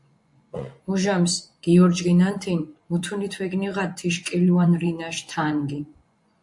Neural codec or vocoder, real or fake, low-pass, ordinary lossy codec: vocoder, 24 kHz, 100 mel bands, Vocos; fake; 10.8 kHz; MP3, 64 kbps